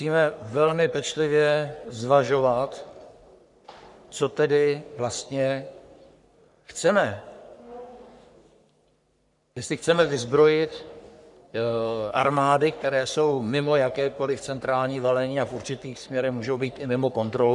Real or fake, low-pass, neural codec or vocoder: fake; 10.8 kHz; codec, 44.1 kHz, 3.4 kbps, Pupu-Codec